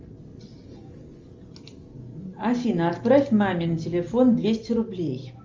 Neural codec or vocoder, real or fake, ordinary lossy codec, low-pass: none; real; Opus, 32 kbps; 7.2 kHz